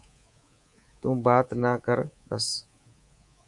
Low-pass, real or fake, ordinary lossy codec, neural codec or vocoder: 10.8 kHz; fake; Opus, 64 kbps; codec, 24 kHz, 3.1 kbps, DualCodec